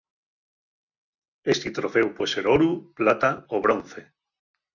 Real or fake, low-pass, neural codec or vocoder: real; 7.2 kHz; none